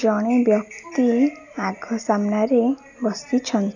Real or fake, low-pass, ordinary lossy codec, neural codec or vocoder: real; 7.2 kHz; none; none